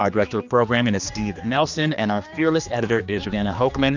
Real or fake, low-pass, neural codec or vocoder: fake; 7.2 kHz; codec, 16 kHz, 2 kbps, X-Codec, HuBERT features, trained on general audio